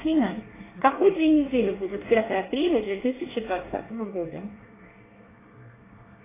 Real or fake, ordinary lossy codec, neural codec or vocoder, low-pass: fake; AAC, 16 kbps; codec, 24 kHz, 1 kbps, SNAC; 3.6 kHz